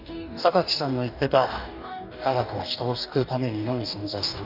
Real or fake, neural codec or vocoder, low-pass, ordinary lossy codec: fake; codec, 44.1 kHz, 2.6 kbps, DAC; 5.4 kHz; none